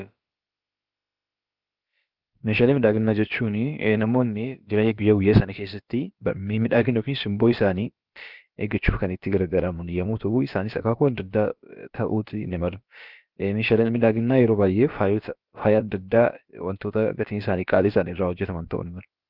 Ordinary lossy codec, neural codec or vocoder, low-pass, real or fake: Opus, 32 kbps; codec, 16 kHz, about 1 kbps, DyCAST, with the encoder's durations; 5.4 kHz; fake